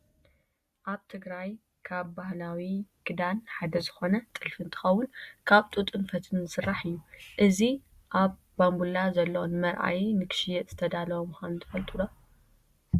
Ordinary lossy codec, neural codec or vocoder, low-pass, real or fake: Opus, 64 kbps; none; 14.4 kHz; real